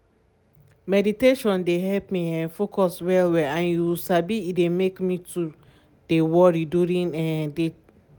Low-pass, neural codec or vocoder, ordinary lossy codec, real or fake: none; none; none; real